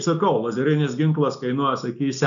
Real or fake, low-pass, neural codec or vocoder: real; 7.2 kHz; none